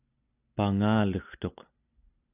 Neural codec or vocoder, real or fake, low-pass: none; real; 3.6 kHz